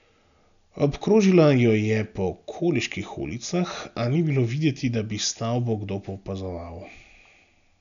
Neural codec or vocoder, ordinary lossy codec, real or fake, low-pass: none; none; real; 7.2 kHz